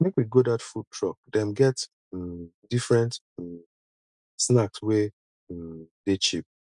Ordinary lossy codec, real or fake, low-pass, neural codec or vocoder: MP3, 96 kbps; real; 10.8 kHz; none